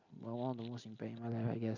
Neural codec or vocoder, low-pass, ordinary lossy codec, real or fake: none; 7.2 kHz; none; real